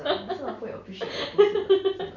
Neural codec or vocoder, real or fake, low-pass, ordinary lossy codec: none; real; 7.2 kHz; none